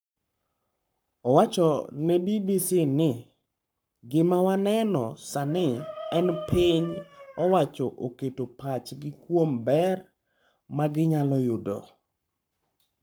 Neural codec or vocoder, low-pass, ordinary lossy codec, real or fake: codec, 44.1 kHz, 7.8 kbps, Pupu-Codec; none; none; fake